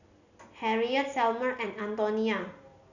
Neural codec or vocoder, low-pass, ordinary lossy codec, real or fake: none; 7.2 kHz; none; real